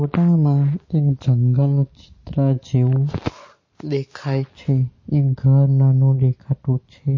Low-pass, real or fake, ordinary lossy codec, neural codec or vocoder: 7.2 kHz; real; MP3, 32 kbps; none